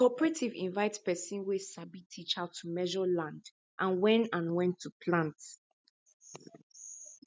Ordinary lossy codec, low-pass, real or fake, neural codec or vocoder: none; none; real; none